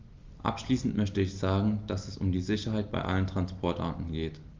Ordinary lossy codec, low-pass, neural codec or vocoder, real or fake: Opus, 32 kbps; 7.2 kHz; none; real